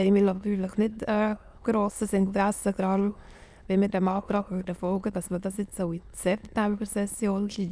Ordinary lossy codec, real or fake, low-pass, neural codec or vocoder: none; fake; none; autoencoder, 22.05 kHz, a latent of 192 numbers a frame, VITS, trained on many speakers